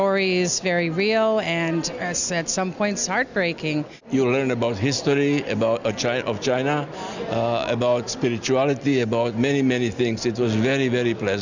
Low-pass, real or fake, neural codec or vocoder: 7.2 kHz; real; none